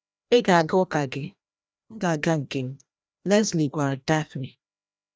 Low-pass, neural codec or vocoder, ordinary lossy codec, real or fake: none; codec, 16 kHz, 1 kbps, FreqCodec, larger model; none; fake